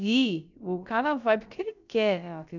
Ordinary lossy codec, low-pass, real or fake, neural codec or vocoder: none; 7.2 kHz; fake; codec, 16 kHz, about 1 kbps, DyCAST, with the encoder's durations